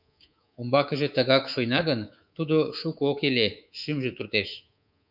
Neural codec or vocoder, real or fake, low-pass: codec, 24 kHz, 3.1 kbps, DualCodec; fake; 5.4 kHz